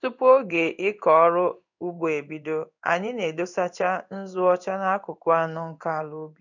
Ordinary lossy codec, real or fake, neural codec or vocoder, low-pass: none; fake; codec, 16 kHz in and 24 kHz out, 1 kbps, XY-Tokenizer; 7.2 kHz